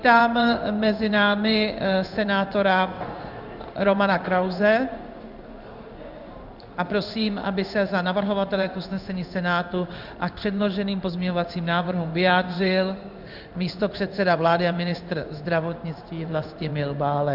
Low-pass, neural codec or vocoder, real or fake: 5.4 kHz; codec, 16 kHz in and 24 kHz out, 1 kbps, XY-Tokenizer; fake